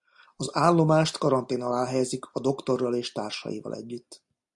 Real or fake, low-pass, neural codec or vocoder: real; 10.8 kHz; none